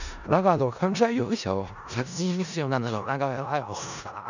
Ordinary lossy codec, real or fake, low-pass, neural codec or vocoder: none; fake; 7.2 kHz; codec, 16 kHz in and 24 kHz out, 0.4 kbps, LongCat-Audio-Codec, four codebook decoder